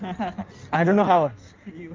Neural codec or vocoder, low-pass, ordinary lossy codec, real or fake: codec, 16 kHz in and 24 kHz out, 1.1 kbps, FireRedTTS-2 codec; 7.2 kHz; Opus, 24 kbps; fake